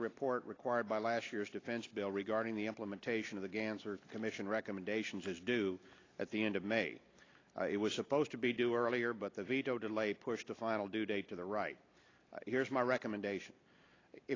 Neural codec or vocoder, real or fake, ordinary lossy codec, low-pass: none; real; AAC, 32 kbps; 7.2 kHz